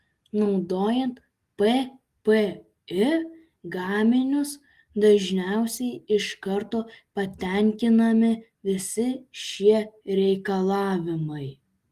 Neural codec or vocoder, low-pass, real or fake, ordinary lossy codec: none; 14.4 kHz; real; Opus, 24 kbps